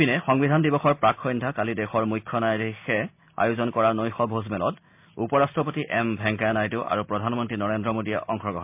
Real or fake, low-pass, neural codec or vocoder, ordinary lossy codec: real; 3.6 kHz; none; MP3, 32 kbps